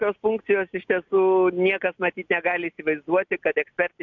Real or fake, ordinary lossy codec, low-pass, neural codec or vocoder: real; MP3, 64 kbps; 7.2 kHz; none